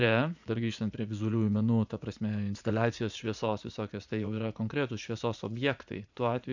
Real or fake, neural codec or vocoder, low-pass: fake; vocoder, 44.1 kHz, 80 mel bands, Vocos; 7.2 kHz